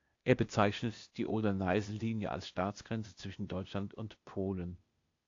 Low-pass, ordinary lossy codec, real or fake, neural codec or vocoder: 7.2 kHz; AAC, 48 kbps; fake; codec, 16 kHz, 0.8 kbps, ZipCodec